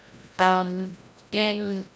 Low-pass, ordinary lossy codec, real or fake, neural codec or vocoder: none; none; fake; codec, 16 kHz, 0.5 kbps, FreqCodec, larger model